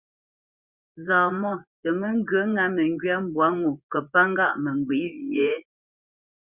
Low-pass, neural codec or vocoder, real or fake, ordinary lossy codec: 3.6 kHz; vocoder, 24 kHz, 100 mel bands, Vocos; fake; Opus, 64 kbps